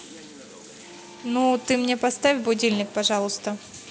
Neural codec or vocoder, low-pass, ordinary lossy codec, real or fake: none; none; none; real